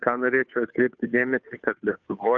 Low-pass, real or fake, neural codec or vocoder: 7.2 kHz; fake; codec, 16 kHz, 2 kbps, FunCodec, trained on Chinese and English, 25 frames a second